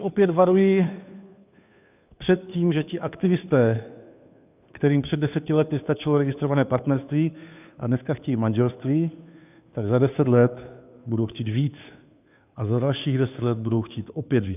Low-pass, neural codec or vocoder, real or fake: 3.6 kHz; codec, 16 kHz, 2 kbps, FunCodec, trained on Chinese and English, 25 frames a second; fake